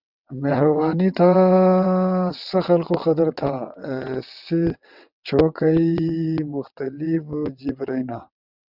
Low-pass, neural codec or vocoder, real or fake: 5.4 kHz; vocoder, 22.05 kHz, 80 mel bands, WaveNeXt; fake